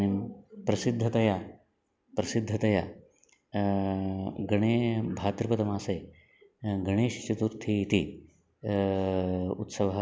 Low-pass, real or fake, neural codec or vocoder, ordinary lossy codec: none; real; none; none